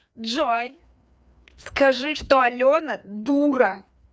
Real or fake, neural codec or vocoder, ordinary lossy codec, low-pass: fake; codec, 16 kHz, 2 kbps, FreqCodec, larger model; none; none